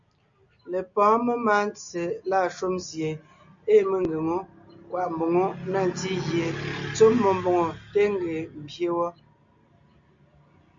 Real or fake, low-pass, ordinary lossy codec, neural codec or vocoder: real; 7.2 kHz; MP3, 64 kbps; none